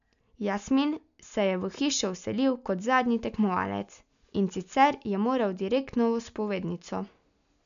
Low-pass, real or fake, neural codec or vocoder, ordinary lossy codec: 7.2 kHz; real; none; none